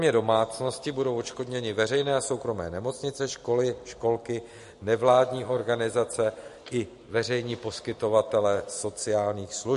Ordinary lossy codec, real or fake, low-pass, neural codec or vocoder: MP3, 48 kbps; real; 14.4 kHz; none